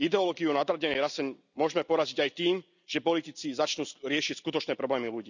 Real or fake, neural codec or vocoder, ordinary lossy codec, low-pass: real; none; none; 7.2 kHz